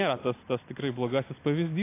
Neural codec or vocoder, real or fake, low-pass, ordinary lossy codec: none; real; 3.6 kHz; AAC, 24 kbps